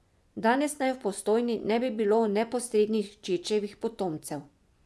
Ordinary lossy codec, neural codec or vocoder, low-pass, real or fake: none; vocoder, 24 kHz, 100 mel bands, Vocos; none; fake